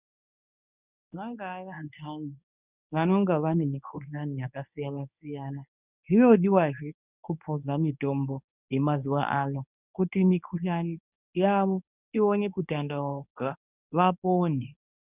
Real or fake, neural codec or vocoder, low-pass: fake; codec, 24 kHz, 0.9 kbps, WavTokenizer, medium speech release version 1; 3.6 kHz